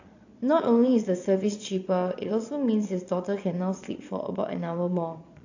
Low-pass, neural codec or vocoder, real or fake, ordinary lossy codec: 7.2 kHz; vocoder, 22.05 kHz, 80 mel bands, Vocos; fake; AAC, 48 kbps